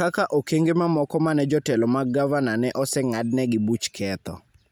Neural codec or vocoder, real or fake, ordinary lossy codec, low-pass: none; real; none; none